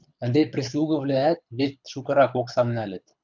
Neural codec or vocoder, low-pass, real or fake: codec, 24 kHz, 6 kbps, HILCodec; 7.2 kHz; fake